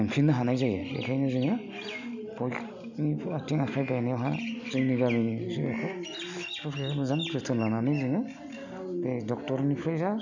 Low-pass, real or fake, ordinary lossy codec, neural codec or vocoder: 7.2 kHz; real; none; none